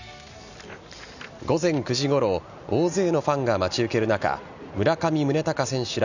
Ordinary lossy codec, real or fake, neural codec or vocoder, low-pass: none; real; none; 7.2 kHz